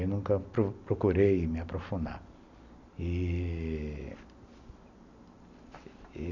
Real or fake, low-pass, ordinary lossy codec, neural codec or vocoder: real; 7.2 kHz; none; none